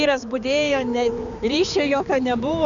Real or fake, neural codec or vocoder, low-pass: fake; codec, 16 kHz, 4 kbps, X-Codec, HuBERT features, trained on balanced general audio; 7.2 kHz